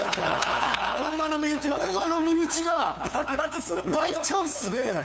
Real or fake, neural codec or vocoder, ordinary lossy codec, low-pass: fake; codec, 16 kHz, 2 kbps, FunCodec, trained on LibriTTS, 25 frames a second; none; none